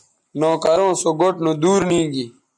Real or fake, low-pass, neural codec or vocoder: real; 10.8 kHz; none